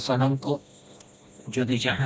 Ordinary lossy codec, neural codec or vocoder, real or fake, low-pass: none; codec, 16 kHz, 1 kbps, FreqCodec, smaller model; fake; none